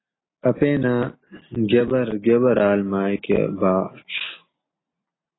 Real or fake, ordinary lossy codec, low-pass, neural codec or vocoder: real; AAC, 16 kbps; 7.2 kHz; none